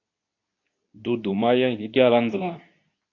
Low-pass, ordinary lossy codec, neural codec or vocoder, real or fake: 7.2 kHz; AAC, 48 kbps; codec, 24 kHz, 0.9 kbps, WavTokenizer, medium speech release version 2; fake